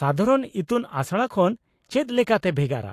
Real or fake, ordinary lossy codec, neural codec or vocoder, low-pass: fake; AAC, 64 kbps; codec, 44.1 kHz, 7.8 kbps, Pupu-Codec; 14.4 kHz